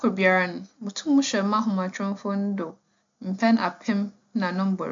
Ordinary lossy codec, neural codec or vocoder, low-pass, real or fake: MP3, 48 kbps; none; 7.2 kHz; real